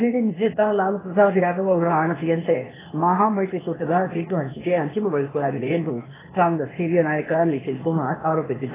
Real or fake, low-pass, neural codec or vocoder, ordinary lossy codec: fake; 3.6 kHz; codec, 16 kHz, 0.8 kbps, ZipCodec; AAC, 16 kbps